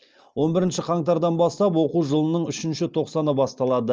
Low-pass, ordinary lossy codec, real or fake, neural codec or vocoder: 7.2 kHz; Opus, 24 kbps; real; none